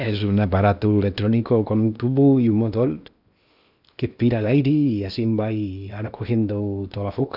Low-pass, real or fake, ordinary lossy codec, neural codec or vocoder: 5.4 kHz; fake; none; codec, 16 kHz in and 24 kHz out, 0.8 kbps, FocalCodec, streaming, 65536 codes